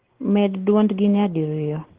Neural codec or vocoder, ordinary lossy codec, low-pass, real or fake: none; Opus, 16 kbps; 3.6 kHz; real